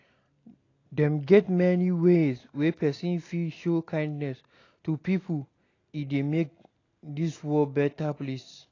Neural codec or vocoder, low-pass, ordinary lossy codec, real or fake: none; 7.2 kHz; AAC, 32 kbps; real